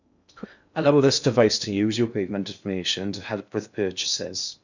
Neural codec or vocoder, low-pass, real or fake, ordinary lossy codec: codec, 16 kHz in and 24 kHz out, 0.6 kbps, FocalCodec, streaming, 2048 codes; 7.2 kHz; fake; none